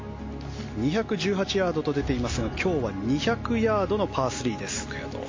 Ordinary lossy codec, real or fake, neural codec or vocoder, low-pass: MP3, 32 kbps; real; none; 7.2 kHz